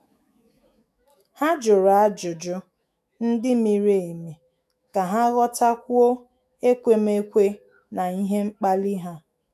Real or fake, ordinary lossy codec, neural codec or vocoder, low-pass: fake; none; autoencoder, 48 kHz, 128 numbers a frame, DAC-VAE, trained on Japanese speech; 14.4 kHz